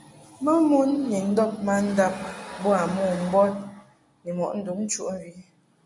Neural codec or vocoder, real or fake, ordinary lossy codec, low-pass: none; real; MP3, 48 kbps; 10.8 kHz